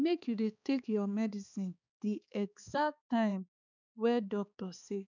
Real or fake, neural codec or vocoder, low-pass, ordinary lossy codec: fake; codec, 16 kHz, 4 kbps, X-Codec, HuBERT features, trained on balanced general audio; 7.2 kHz; none